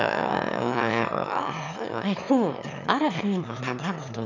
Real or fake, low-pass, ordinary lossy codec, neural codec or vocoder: fake; 7.2 kHz; none; autoencoder, 22.05 kHz, a latent of 192 numbers a frame, VITS, trained on one speaker